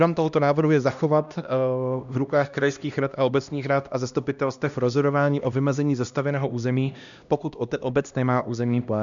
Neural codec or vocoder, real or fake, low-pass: codec, 16 kHz, 1 kbps, X-Codec, HuBERT features, trained on LibriSpeech; fake; 7.2 kHz